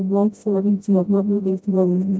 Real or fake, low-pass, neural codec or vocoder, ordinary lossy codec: fake; none; codec, 16 kHz, 0.5 kbps, FreqCodec, smaller model; none